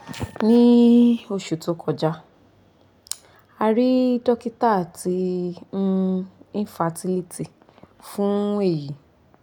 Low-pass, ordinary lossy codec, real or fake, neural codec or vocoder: 19.8 kHz; none; real; none